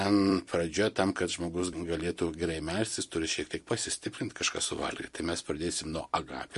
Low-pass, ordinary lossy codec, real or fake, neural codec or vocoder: 14.4 kHz; MP3, 48 kbps; fake; vocoder, 44.1 kHz, 128 mel bands every 256 samples, BigVGAN v2